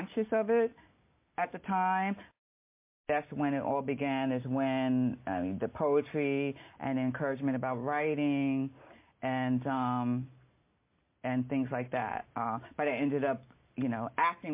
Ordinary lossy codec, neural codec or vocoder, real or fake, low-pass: MP3, 24 kbps; none; real; 3.6 kHz